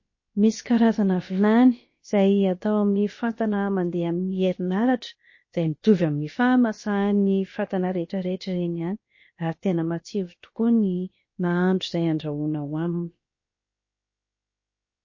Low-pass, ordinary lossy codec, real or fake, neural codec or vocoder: 7.2 kHz; MP3, 32 kbps; fake; codec, 16 kHz, about 1 kbps, DyCAST, with the encoder's durations